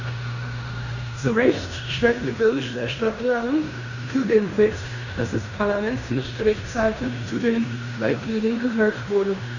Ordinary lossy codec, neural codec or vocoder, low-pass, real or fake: none; codec, 16 kHz in and 24 kHz out, 0.9 kbps, LongCat-Audio-Codec, fine tuned four codebook decoder; 7.2 kHz; fake